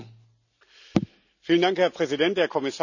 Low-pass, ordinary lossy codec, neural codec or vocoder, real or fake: 7.2 kHz; none; none; real